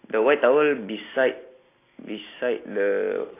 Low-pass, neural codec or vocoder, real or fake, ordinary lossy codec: 3.6 kHz; none; real; AAC, 24 kbps